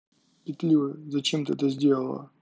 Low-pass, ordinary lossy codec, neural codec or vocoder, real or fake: none; none; none; real